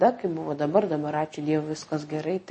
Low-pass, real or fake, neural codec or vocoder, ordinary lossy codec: 9.9 kHz; real; none; MP3, 32 kbps